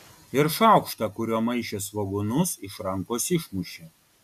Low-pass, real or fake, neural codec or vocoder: 14.4 kHz; real; none